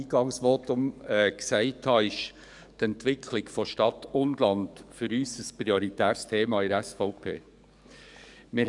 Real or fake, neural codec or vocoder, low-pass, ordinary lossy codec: fake; codec, 44.1 kHz, 7.8 kbps, DAC; 10.8 kHz; none